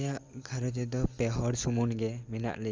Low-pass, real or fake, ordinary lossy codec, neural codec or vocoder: 7.2 kHz; real; Opus, 32 kbps; none